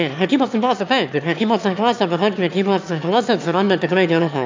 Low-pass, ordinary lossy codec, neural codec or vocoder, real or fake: 7.2 kHz; MP3, 64 kbps; autoencoder, 22.05 kHz, a latent of 192 numbers a frame, VITS, trained on one speaker; fake